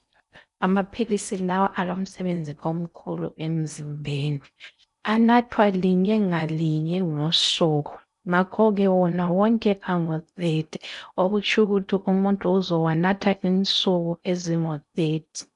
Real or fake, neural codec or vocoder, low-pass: fake; codec, 16 kHz in and 24 kHz out, 0.6 kbps, FocalCodec, streaming, 4096 codes; 10.8 kHz